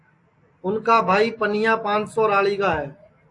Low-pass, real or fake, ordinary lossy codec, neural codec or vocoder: 10.8 kHz; real; AAC, 48 kbps; none